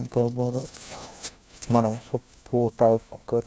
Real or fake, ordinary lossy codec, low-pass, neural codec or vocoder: fake; none; none; codec, 16 kHz, 1 kbps, FunCodec, trained on LibriTTS, 50 frames a second